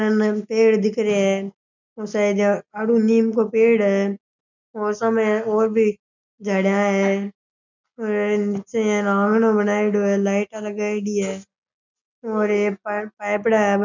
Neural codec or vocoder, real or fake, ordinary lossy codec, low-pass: none; real; none; 7.2 kHz